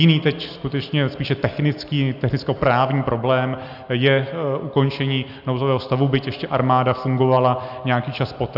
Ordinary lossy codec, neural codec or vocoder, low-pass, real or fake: AAC, 48 kbps; none; 5.4 kHz; real